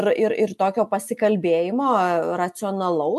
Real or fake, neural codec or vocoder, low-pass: real; none; 14.4 kHz